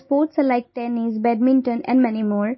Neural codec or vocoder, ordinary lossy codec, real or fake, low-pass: none; MP3, 24 kbps; real; 7.2 kHz